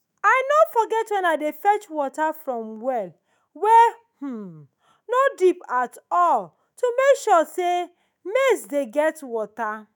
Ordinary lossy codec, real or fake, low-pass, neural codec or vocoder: none; fake; none; autoencoder, 48 kHz, 128 numbers a frame, DAC-VAE, trained on Japanese speech